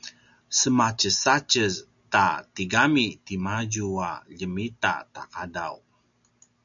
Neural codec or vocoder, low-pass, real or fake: none; 7.2 kHz; real